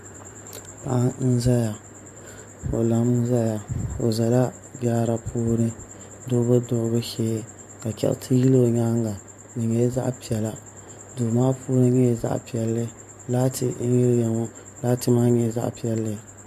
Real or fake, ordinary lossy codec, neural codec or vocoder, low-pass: fake; MP3, 64 kbps; vocoder, 44.1 kHz, 128 mel bands every 512 samples, BigVGAN v2; 14.4 kHz